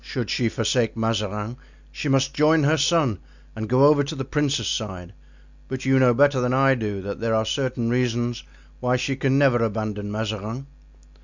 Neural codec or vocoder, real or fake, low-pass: none; real; 7.2 kHz